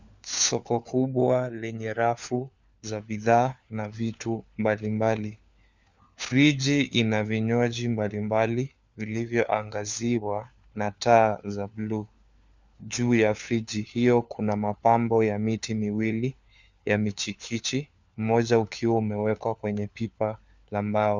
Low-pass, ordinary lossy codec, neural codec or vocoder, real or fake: 7.2 kHz; Opus, 64 kbps; codec, 16 kHz, 4 kbps, FunCodec, trained on LibriTTS, 50 frames a second; fake